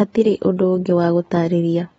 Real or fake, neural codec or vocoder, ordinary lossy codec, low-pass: real; none; AAC, 24 kbps; 19.8 kHz